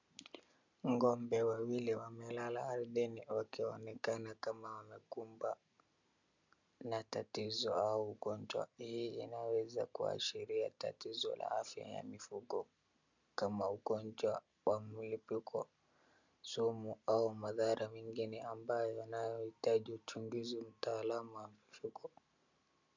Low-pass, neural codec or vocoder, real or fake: 7.2 kHz; none; real